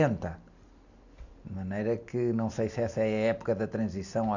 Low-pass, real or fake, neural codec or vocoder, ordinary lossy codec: 7.2 kHz; real; none; none